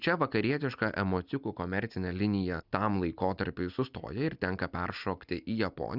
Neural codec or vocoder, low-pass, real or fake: none; 5.4 kHz; real